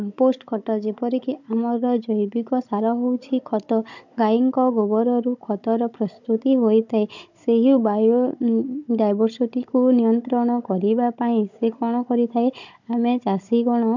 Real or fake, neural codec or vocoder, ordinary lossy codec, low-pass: fake; codec, 16 kHz, 16 kbps, FunCodec, trained on Chinese and English, 50 frames a second; none; 7.2 kHz